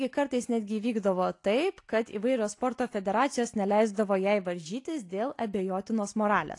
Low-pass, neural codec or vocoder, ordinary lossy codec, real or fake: 10.8 kHz; none; AAC, 48 kbps; real